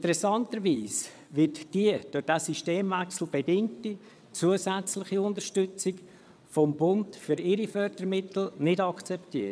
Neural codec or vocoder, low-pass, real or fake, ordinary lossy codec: vocoder, 22.05 kHz, 80 mel bands, WaveNeXt; none; fake; none